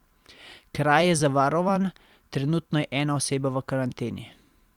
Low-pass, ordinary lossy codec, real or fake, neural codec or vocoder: 19.8 kHz; Opus, 64 kbps; fake; vocoder, 44.1 kHz, 128 mel bands every 256 samples, BigVGAN v2